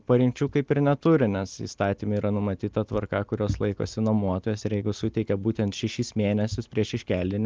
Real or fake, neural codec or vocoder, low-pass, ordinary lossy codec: real; none; 7.2 kHz; Opus, 24 kbps